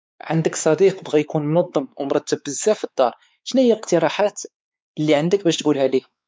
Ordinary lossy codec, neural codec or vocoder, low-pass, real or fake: none; codec, 16 kHz, 4 kbps, X-Codec, WavLM features, trained on Multilingual LibriSpeech; none; fake